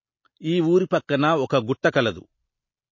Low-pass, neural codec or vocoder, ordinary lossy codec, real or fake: 7.2 kHz; none; MP3, 32 kbps; real